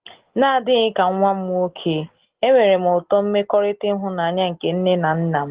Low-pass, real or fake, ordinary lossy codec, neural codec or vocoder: 3.6 kHz; real; Opus, 16 kbps; none